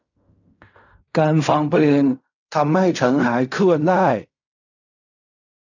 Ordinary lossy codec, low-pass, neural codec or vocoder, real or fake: none; 7.2 kHz; codec, 16 kHz in and 24 kHz out, 0.4 kbps, LongCat-Audio-Codec, fine tuned four codebook decoder; fake